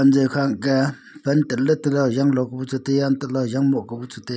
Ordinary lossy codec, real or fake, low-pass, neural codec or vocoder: none; real; none; none